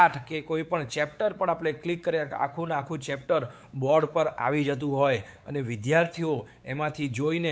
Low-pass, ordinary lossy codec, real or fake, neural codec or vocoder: none; none; fake; codec, 16 kHz, 4 kbps, X-Codec, WavLM features, trained on Multilingual LibriSpeech